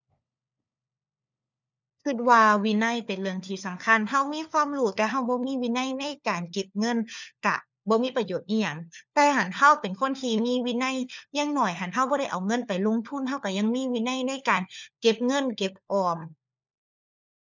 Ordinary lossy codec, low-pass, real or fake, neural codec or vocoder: none; 7.2 kHz; fake; codec, 16 kHz, 4 kbps, FunCodec, trained on LibriTTS, 50 frames a second